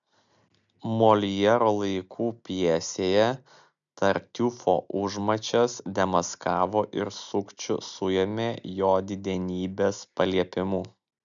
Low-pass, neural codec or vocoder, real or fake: 7.2 kHz; none; real